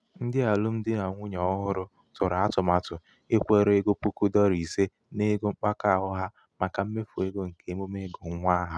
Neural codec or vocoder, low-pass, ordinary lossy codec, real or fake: none; none; none; real